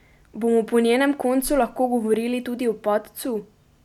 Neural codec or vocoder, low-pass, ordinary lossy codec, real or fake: none; 19.8 kHz; none; real